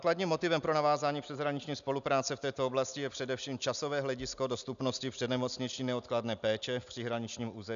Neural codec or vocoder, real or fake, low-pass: none; real; 7.2 kHz